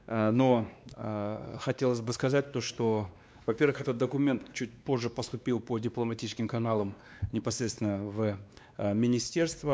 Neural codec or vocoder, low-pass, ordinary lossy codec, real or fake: codec, 16 kHz, 2 kbps, X-Codec, WavLM features, trained on Multilingual LibriSpeech; none; none; fake